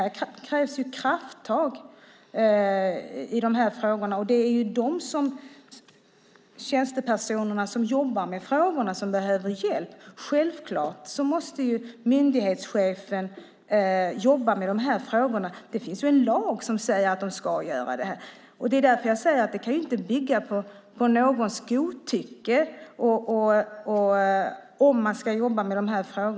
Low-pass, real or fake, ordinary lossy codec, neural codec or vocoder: none; real; none; none